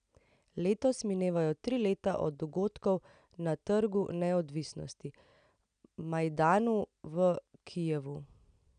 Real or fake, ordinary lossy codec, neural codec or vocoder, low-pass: real; none; none; 9.9 kHz